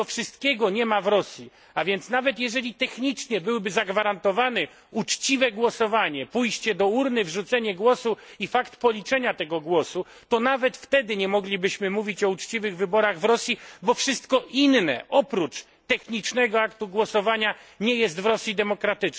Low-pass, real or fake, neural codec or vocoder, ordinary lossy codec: none; real; none; none